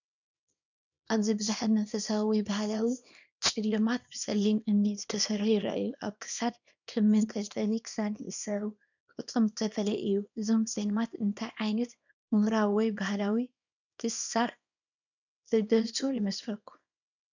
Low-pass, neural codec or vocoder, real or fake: 7.2 kHz; codec, 24 kHz, 0.9 kbps, WavTokenizer, small release; fake